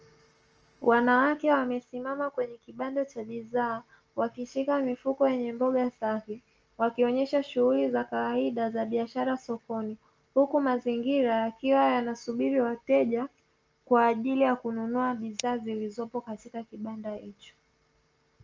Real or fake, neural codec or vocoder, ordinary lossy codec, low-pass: real; none; Opus, 24 kbps; 7.2 kHz